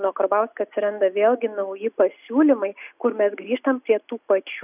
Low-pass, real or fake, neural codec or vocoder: 3.6 kHz; real; none